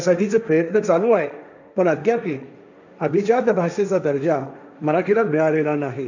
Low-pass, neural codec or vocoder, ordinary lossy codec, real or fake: 7.2 kHz; codec, 16 kHz, 1.1 kbps, Voila-Tokenizer; none; fake